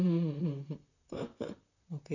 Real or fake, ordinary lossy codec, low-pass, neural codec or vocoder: fake; none; 7.2 kHz; vocoder, 44.1 kHz, 128 mel bands, Pupu-Vocoder